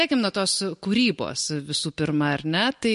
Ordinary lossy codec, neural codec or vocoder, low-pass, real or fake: MP3, 48 kbps; none; 14.4 kHz; real